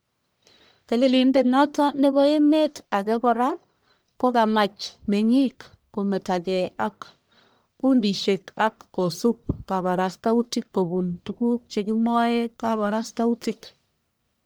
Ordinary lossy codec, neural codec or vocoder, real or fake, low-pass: none; codec, 44.1 kHz, 1.7 kbps, Pupu-Codec; fake; none